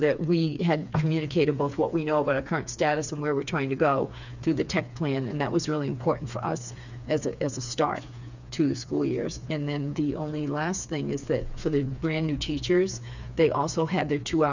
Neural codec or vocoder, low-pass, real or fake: codec, 16 kHz, 4 kbps, FreqCodec, smaller model; 7.2 kHz; fake